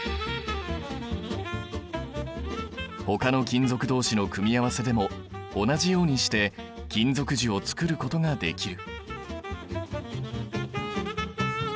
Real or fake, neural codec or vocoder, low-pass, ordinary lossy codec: real; none; none; none